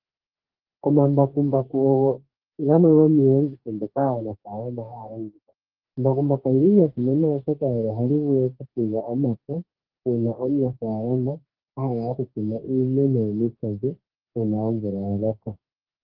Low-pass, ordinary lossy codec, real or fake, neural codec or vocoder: 5.4 kHz; Opus, 16 kbps; fake; codec, 44.1 kHz, 2.6 kbps, DAC